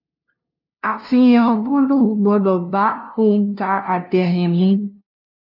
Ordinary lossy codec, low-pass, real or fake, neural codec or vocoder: none; 5.4 kHz; fake; codec, 16 kHz, 0.5 kbps, FunCodec, trained on LibriTTS, 25 frames a second